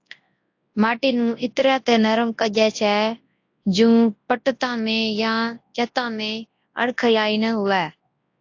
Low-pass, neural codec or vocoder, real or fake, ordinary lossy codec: 7.2 kHz; codec, 24 kHz, 0.9 kbps, WavTokenizer, large speech release; fake; AAC, 48 kbps